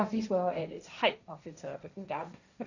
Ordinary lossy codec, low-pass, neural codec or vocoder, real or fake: none; 7.2 kHz; codec, 16 kHz, 1.1 kbps, Voila-Tokenizer; fake